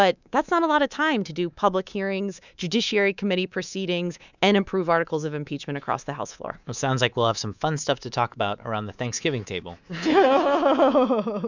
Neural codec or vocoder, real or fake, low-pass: codec, 24 kHz, 3.1 kbps, DualCodec; fake; 7.2 kHz